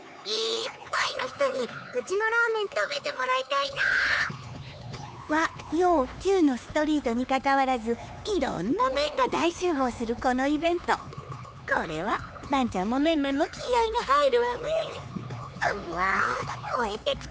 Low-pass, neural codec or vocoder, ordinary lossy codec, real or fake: none; codec, 16 kHz, 4 kbps, X-Codec, HuBERT features, trained on LibriSpeech; none; fake